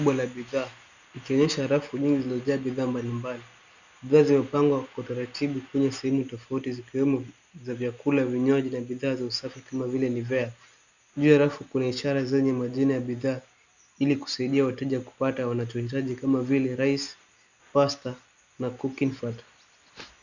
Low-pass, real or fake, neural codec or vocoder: 7.2 kHz; real; none